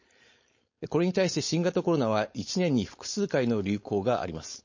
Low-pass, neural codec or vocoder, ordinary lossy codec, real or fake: 7.2 kHz; codec, 16 kHz, 4.8 kbps, FACodec; MP3, 32 kbps; fake